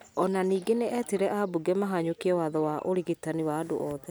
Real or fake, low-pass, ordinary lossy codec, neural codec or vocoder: real; none; none; none